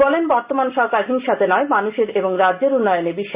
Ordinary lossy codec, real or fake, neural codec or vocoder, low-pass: Opus, 64 kbps; real; none; 3.6 kHz